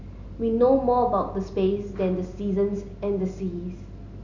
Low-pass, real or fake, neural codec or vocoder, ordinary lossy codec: 7.2 kHz; real; none; none